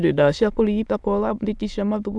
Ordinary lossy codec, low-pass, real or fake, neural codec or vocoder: none; none; fake; autoencoder, 22.05 kHz, a latent of 192 numbers a frame, VITS, trained on many speakers